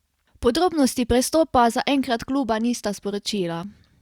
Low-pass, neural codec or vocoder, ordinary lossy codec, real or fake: 19.8 kHz; none; Opus, 64 kbps; real